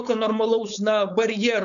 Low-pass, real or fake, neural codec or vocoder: 7.2 kHz; fake; codec, 16 kHz, 4.8 kbps, FACodec